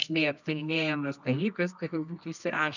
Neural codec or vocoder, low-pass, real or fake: codec, 24 kHz, 0.9 kbps, WavTokenizer, medium music audio release; 7.2 kHz; fake